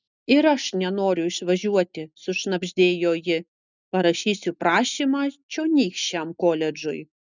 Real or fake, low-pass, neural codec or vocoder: real; 7.2 kHz; none